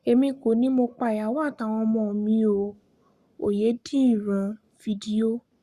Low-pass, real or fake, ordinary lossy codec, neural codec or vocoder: 14.4 kHz; fake; Opus, 64 kbps; codec, 44.1 kHz, 7.8 kbps, Pupu-Codec